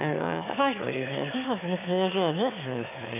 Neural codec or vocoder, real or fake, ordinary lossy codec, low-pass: autoencoder, 22.05 kHz, a latent of 192 numbers a frame, VITS, trained on one speaker; fake; none; 3.6 kHz